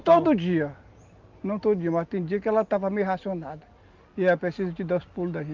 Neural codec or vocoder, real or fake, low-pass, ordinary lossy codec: none; real; 7.2 kHz; Opus, 24 kbps